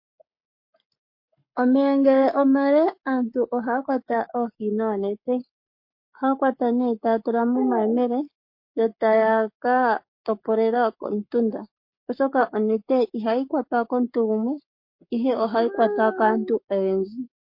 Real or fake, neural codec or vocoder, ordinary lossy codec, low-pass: fake; codec, 44.1 kHz, 7.8 kbps, Pupu-Codec; MP3, 32 kbps; 5.4 kHz